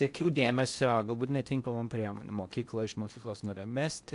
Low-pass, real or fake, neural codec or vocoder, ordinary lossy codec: 10.8 kHz; fake; codec, 16 kHz in and 24 kHz out, 0.6 kbps, FocalCodec, streaming, 4096 codes; AAC, 64 kbps